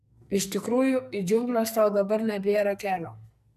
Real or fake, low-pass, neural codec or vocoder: fake; 14.4 kHz; codec, 44.1 kHz, 2.6 kbps, SNAC